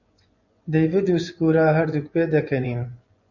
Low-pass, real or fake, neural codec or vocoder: 7.2 kHz; fake; vocoder, 24 kHz, 100 mel bands, Vocos